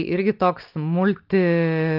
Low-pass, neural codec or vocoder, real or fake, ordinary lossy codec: 5.4 kHz; none; real; Opus, 24 kbps